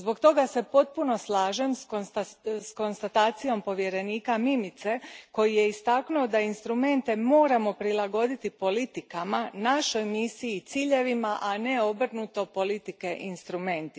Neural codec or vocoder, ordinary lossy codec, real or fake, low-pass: none; none; real; none